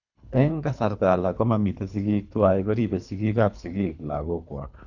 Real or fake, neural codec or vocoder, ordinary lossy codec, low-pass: fake; codec, 24 kHz, 3 kbps, HILCodec; none; 7.2 kHz